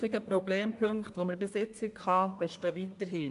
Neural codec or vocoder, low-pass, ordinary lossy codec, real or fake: codec, 24 kHz, 1 kbps, SNAC; 10.8 kHz; AAC, 64 kbps; fake